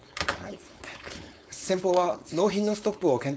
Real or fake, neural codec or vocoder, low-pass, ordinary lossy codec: fake; codec, 16 kHz, 4.8 kbps, FACodec; none; none